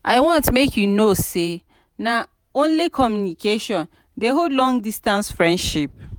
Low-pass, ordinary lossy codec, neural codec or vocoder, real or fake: none; none; vocoder, 48 kHz, 128 mel bands, Vocos; fake